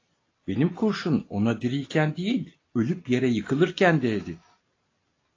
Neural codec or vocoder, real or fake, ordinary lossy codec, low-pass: none; real; AAC, 32 kbps; 7.2 kHz